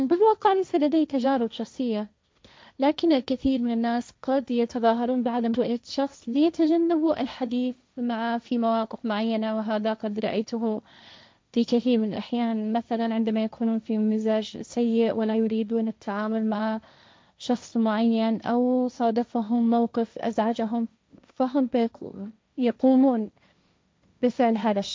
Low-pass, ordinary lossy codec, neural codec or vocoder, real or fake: none; none; codec, 16 kHz, 1.1 kbps, Voila-Tokenizer; fake